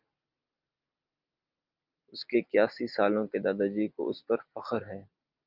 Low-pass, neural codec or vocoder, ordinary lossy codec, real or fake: 5.4 kHz; none; Opus, 24 kbps; real